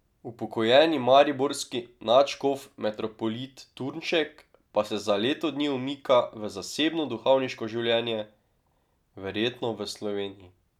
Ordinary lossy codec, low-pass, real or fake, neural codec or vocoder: none; 19.8 kHz; real; none